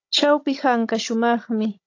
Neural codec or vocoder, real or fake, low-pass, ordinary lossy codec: codec, 16 kHz, 16 kbps, FunCodec, trained on Chinese and English, 50 frames a second; fake; 7.2 kHz; AAC, 48 kbps